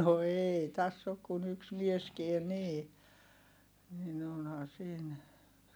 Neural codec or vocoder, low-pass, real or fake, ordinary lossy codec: none; none; real; none